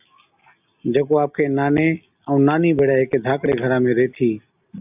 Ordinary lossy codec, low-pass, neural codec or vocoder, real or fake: AAC, 32 kbps; 3.6 kHz; none; real